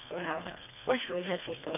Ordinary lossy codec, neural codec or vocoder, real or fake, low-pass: none; codec, 24 kHz, 1.5 kbps, HILCodec; fake; 3.6 kHz